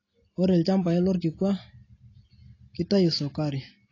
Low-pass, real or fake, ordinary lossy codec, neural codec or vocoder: 7.2 kHz; real; AAC, 32 kbps; none